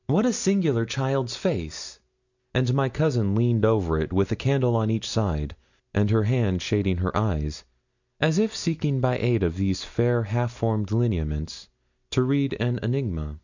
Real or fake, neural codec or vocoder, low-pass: real; none; 7.2 kHz